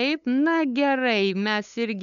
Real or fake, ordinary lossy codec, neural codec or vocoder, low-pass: fake; MP3, 96 kbps; codec, 16 kHz, 8 kbps, FunCodec, trained on LibriTTS, 25 frames a second; 7.2 kHz